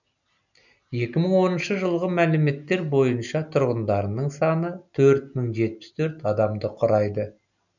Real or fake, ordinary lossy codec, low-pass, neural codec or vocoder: real; none; 7.2 kHz; none